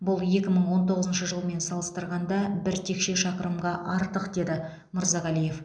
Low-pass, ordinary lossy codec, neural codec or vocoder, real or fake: 9.9 kHz; none; none; real